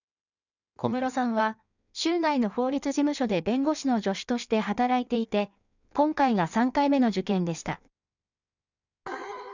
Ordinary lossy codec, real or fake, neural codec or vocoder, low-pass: none; fake; codec, 16 kHz in and 24 kHz out, 1.1 kbps, FireRedTTS-2 codec; 7.2 kHz